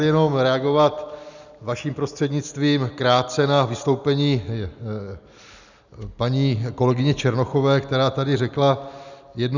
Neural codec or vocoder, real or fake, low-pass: none; real; 7.2 kHz